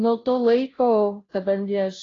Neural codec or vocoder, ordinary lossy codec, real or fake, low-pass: codec, 16 kHz, 0.5 kbps, FunCodec, trained on Chinese and English, 25 frames a second; AAC, 32 kbps; fake; 7.2 kHz